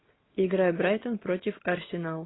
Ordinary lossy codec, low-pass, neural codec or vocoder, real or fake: AAC, 16 kbps; 7.2 kHz; none; real